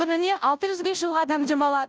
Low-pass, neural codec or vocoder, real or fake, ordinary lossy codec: none; codec, 16 kHz, 0.5 kbps, FunCodec, trained on Chinese and English, 25 frames a second; fake; none